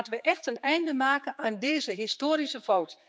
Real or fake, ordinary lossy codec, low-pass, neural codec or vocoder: fake; none; none; codec, 16 kHz, 4 kbps, X-Codec, HuBERT features, trained on general audio